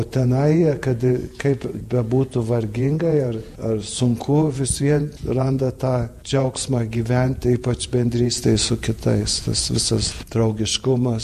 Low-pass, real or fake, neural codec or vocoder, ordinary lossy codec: 14.4 kHz; fake; vocoder, 48 kHz, 128 mel bands, Vocos; MP3, 64 kbps